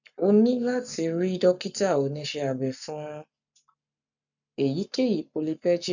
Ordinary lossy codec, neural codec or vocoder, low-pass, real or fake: none; codec, 44.1 kHz, 7.8 kbps, Pupu-Codec; 7.2 kHz; fake